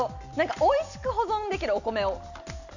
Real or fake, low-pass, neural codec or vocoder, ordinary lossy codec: real; 7.2 kHz; none; none